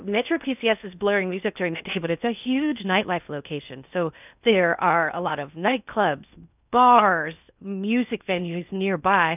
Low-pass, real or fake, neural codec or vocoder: 3.6 kHz; fake; codec, 16 kHz in and 24 kHz out, 0.6 kbps, FocalCodec, streaming, 4096 codes